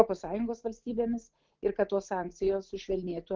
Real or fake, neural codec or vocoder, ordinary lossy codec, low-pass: real; none; Opus, 16 kbps; 7.2 kHz